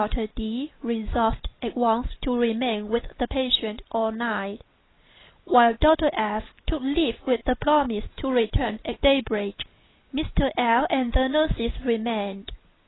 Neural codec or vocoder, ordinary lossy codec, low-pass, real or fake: none; AAC, 16 kbps; 7.2 kHz; real